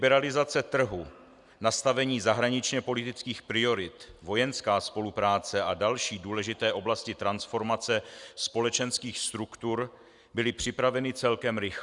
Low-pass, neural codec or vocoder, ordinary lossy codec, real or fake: 10.8 kHz; none; Opus, 64 kbps; real